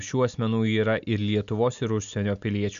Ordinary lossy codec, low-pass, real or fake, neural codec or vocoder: MP3, 64 kbps; 7.2 kHz; real; none